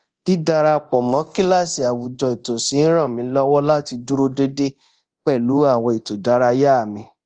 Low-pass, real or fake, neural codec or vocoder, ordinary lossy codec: 9.9 kHz; fake; codec, 24 kHz, 0.9 kbps, DualCodec; Opus, 24 kbps